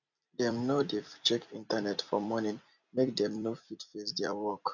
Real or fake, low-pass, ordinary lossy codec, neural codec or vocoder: fake; 7.2 kHz; none; vocoder, 44.1 kHz, 128 mel bands every 256 samples, BigVGAN v2